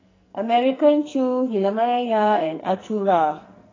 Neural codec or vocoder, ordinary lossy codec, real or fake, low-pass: codec, 44.1 kHz, 2.6 kbps, SNAC; AAC, 32 kbps; fake; 7.2 kHz